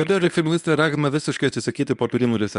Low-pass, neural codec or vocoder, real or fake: 10.8 kHz; codec, 24 kHz, 0.9 kbps, WavTokenizer, medium speech release version 1; fake